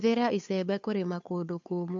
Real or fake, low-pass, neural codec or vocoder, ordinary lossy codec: fake; 7.2 kHz; codec, 16 kHz, 2 kbps, FunCodec, trained on Chinese and English, 25 frames a second; MP3, 64 kbps